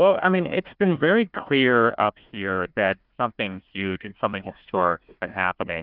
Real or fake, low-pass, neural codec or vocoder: fake; 5.4 kHz; codec, 16 kHz, 1 kbps, FunCodec, trained on Chinese and English, 50 frames a second